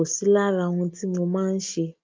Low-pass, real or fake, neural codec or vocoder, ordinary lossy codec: 7.2 kHz; real; none; Opus, 24 kbps